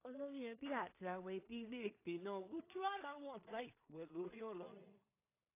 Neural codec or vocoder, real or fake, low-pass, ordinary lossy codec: codec, 16 kHz in and 24 kHz out, 0.4 kbps, LongCat-Audio-Codec, two codebook decoder; fake; 3.6 kHz; AAC, 24 kbps